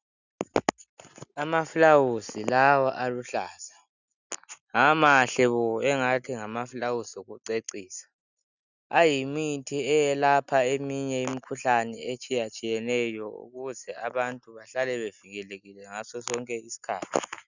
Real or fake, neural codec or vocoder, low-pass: real; none; 7.2 kHz